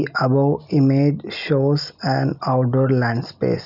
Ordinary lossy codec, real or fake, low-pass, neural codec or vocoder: none; real; 5.4 kHz; none